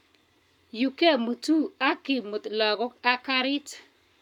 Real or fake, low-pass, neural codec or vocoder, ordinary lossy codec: fake; 19.8 kHz; codec, 44.1 kHz, 7.8 kbps, Pupu-Codec; none